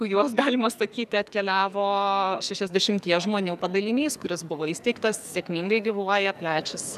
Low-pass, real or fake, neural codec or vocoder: 14.4 kHz; fake; codec, 44.1 kHz, 2.6 kbps, SNAC